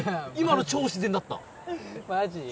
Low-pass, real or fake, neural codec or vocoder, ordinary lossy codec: none; real; none; none